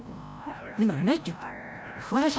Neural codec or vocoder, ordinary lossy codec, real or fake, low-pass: codec, 16 kHz, 0.5 kbps, FreqCodec, larger model; none; fake; none